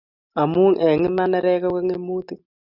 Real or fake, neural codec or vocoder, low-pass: real; none; 5.4 kHz